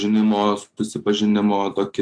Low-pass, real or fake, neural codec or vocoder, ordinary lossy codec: 9.9 kHz; real; none; AAC, 64 kbps